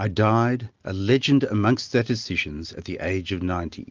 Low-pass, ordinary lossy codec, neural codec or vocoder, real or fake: 7.2 kHz; Opus, 24 kbps; none; real